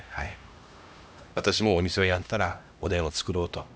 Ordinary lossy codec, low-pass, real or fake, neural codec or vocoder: none; none; fake; codec, 16 kHz, 1 kbps, X-Codec, HuBERT features, trained on LibriSpeech